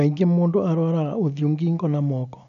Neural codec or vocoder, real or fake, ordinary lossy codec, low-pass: none; real; MP3, 64 kbps; 7.2 kHz